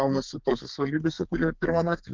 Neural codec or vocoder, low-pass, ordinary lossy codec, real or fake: codec, 32 kHz, 1.9 kbps, SNAC; 7.2 kHz; Opus, 32 kbps; fake